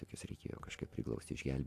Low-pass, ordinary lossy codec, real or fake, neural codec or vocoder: 14.4 kHz; AAC, 64 kbps; real; none